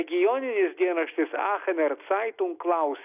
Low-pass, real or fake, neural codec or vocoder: 3.6 kHz; real; none